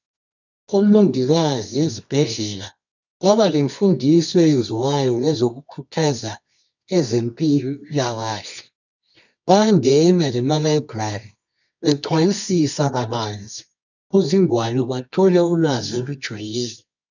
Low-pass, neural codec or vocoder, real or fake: 7.2 kHz; codec, 24 kHz, 0.9 kbps, WavTokenizer, medium music audio release; fake